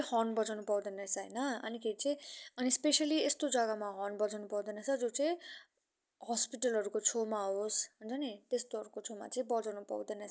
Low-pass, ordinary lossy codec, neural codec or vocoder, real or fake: none; none; none; real